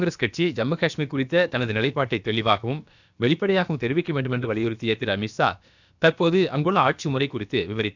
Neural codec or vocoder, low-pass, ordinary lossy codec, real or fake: codec, 16 kHz, about 1 kbps, DyCAST, with the encoder's durations; 7.2 kHz; none; fake